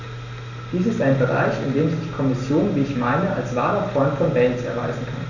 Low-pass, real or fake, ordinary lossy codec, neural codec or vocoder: 7.2 kHz; real; none; none